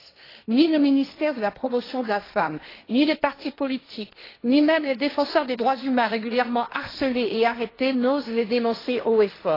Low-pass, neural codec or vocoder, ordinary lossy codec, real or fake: 5.4 kHz; codec, 16 kHz, 1.1 kbps, Voila-Tokenizer; AAC, 24 kbps; fake